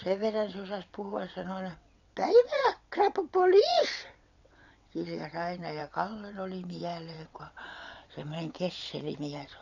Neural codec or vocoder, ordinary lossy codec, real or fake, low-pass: vocoder, 22.05 kHz, 80 mel bands, WaveNeXt; none; fake; 7.2 kHz